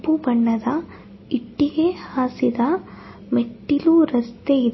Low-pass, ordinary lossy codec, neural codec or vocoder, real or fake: 7.2 kHz; MP3, 24 kbps; none; real